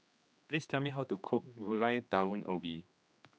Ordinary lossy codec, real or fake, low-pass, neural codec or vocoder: none; fake; none; codec, 16 kHz, 2 kbps, X-Codec, HuBERT features, trained on general audio